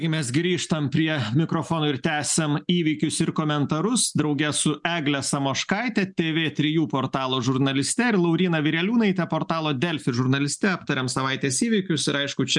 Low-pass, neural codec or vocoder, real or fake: 10.8 kHz; none; real